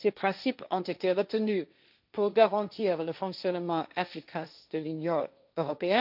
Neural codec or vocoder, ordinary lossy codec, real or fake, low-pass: codec, 16 kHz, 1.1 kbps, Voila-Tokenizer; none; fake; 5.4 kHz